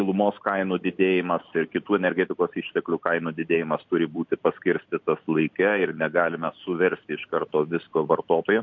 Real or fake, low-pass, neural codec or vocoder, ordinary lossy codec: real; 7.2 kHz; none; MP3, 48 kbps